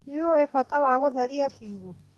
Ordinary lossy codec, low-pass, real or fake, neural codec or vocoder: Opus, 32 kbps; 19.8 kHz; fake; codec, 44.1 kHz, 2.6 kbps, DAC